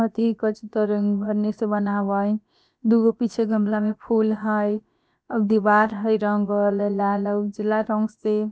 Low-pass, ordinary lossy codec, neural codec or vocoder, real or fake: none; none; codec, 16 kHz, about 1 kbps, DyCAST, with the encoder's durations; fake